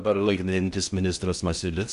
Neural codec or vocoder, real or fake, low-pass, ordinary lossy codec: codec, 16 kHz in and 24 kHz out, 0.6 kbps, FocalCodec, streaming, 2048 codes; fake; 10.8 kHz; AAC, 96 kbps